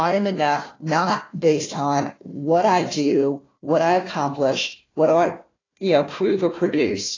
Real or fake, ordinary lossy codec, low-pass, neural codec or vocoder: fake; AAC, 32 kbps; 7.2 kHz; codec, 16 kHz, 1 kbps, FunCodec, trained on Chinese and English, 50 frames a second